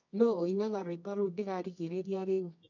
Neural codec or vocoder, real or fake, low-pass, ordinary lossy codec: codec, 24 kHz, 0.9 kbps, WavTokenizer, medium music audio release; fake; 7.2 kHz; none